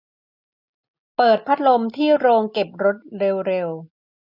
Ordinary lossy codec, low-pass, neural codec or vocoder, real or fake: none; 5.4 kHz; none; real